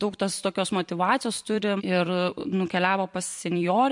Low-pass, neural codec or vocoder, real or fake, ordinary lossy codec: 10.8 kHz; vocoder, 44.1 kHz, 128 mel bands every 256 samples, BigVGAN v2; fake; MP3, 64 kbps